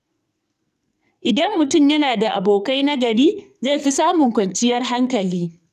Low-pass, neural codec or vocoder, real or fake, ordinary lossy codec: 14.4 kHz; codec, 44.1 kHz, 2.6 kbps, SNAC; fake; none